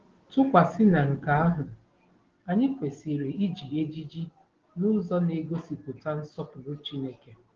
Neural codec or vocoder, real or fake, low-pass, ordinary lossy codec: none; real; 7.2 kHz; Opus, 16 kbps